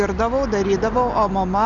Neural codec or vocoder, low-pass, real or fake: none; 7.2 kHz; real